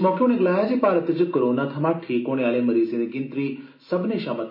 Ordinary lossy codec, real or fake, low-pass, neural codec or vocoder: MP3, 24 kbps; real; 5.4 kHz; none